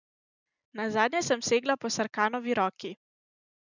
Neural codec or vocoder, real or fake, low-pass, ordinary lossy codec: none; real; 7.2 kHz; none